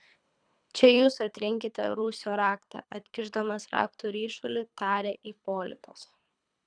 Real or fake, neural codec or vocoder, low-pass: fake; codec, 24 kHz, 3 kbps, HILCodec; 9.9 kHz